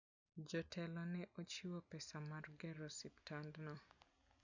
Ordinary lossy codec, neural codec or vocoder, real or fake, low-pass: none; none; real; 7.2 kHz